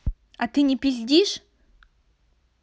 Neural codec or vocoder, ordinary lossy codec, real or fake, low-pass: none; none; real; none